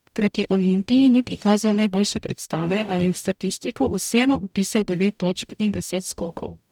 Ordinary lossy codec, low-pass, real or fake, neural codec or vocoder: none; 19.8 kHz; fake; codec, 44.1 kHz, 0.9 kbps, DAC